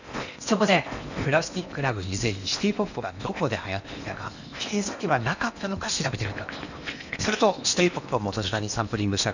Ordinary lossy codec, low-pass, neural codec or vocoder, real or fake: none; 7.2 kHz; codec, 16 kHz in and 24 kHz out, 0.8 kbps, FocalCodec, streaming, 65536 codes; fake